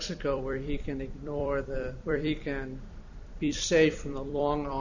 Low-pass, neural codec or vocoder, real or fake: 7.2 kHz; vocoder, 44.1 kHz, 128 mel bands every 256 samples, BigVGAN v2; fake